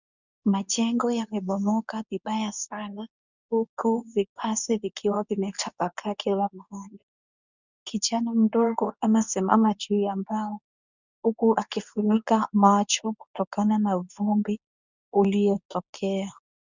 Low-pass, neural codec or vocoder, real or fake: 7.2 kHz; codec, 24 kHz, 0.9 kbps, WavTokenizer, medium speech release version 2; fake